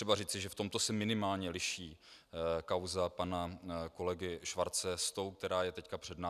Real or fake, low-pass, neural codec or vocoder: real; 14.4 kHz; none